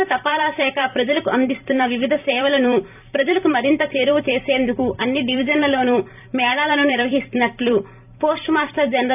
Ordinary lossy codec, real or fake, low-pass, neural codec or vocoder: none; fake; 3.6 kHz; vocoder, 44.1 kHz, 128 mel bands every 256 samples, BigVGAN v2